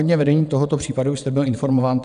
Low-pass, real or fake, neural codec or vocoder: 9.9 kHz; fake; vocoder, 22.05 kHz, 80 mel bands, WaveNeXt